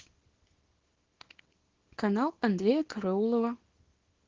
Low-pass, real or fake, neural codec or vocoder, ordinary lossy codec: 7.2 kHz; fake; codec, 24 kHz, 0.9 kbps, WavTokenizer, small release; Opus, 16 kbps